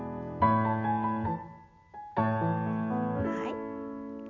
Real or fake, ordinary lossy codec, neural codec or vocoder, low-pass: real; none; none; 7.2 kHz